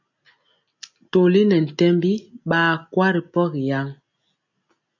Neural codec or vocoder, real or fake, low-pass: none; real; 7.2 kHz